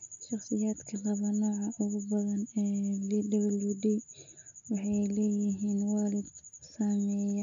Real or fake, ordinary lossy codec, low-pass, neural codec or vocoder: real; MP3, 64 kbps; 7.2 kHz; none